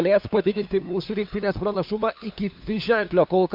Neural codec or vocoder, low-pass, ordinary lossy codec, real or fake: codec, 16 kHz, 2 kbps, FunCodec, trained on LibriTTS, 25 frames a second; 5.4 kHz; MP3, 48 kbps; fake